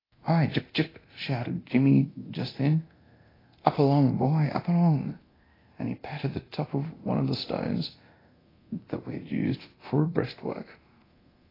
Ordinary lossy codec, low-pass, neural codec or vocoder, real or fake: AAC, 24 kbps; 5.4 kHz; codec, 24 kHz, 0.9 kbps, DualCodec; fake